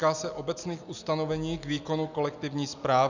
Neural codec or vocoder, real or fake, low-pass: none; real; 7.2 kHz